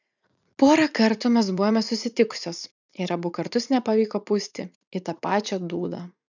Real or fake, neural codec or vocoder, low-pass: fake; vocoder, 44.1 kHz, 80 mel bands, Vocos; 7.2 kHz